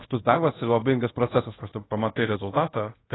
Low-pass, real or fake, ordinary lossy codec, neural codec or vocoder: 7.2 kHz; fake; AAC, 16 kbps; codec, 24 kHz, 0.5 kbps, DualCodec